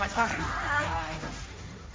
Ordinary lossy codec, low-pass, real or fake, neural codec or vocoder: none; none; fake; codec, 16 kHz, 1.1 kbps, Voila-Tokenizer